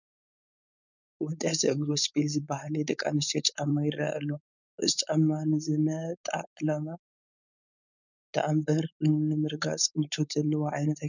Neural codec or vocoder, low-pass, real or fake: codec, 16 kHz, 4.8 kbps, FACodec; 7.2 kHz; fake